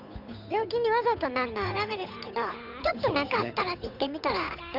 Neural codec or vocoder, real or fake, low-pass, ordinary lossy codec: codec, 16 kHz, 8 kbps, FunCodec, trained on Chinese and English, 25 frames a second; fake; 5.4 kHz; none